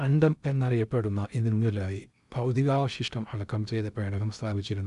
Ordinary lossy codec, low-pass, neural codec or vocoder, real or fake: none; 10.8 kHz; codec, 16 kHz in and 24 kHz out, 0.6 kbps, FocalCodec, streaming, 2048 codes; fake